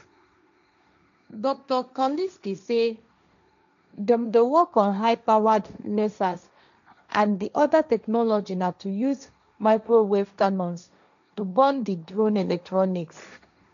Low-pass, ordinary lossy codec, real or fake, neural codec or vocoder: 7.2 kHz; none; fake; codec, 16 kHz, 1.1 kbps, Voila-Tokenizer